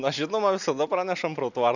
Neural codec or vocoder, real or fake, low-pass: none; real; 7.2 kHz